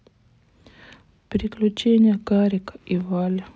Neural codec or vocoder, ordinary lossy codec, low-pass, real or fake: none; none; none; real